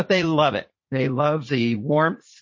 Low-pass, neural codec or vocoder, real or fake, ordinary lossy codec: 7.2 kHz; vocoder, 44.1 kHz, 80 mel bands, Vocos; fake; MP3, 32 kbps